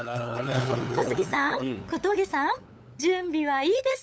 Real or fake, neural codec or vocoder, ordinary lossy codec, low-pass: fake; codec, 16 kHz, 8 kbps, FunCodec, trained on LibriTTS, 25 frames a second; none; none